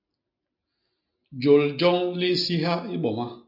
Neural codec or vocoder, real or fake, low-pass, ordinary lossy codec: none; real; 5.4 kHz; MP3, 48 kbps